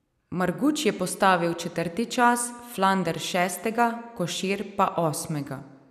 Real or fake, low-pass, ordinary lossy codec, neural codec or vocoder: real; 14.4 kHz; none; none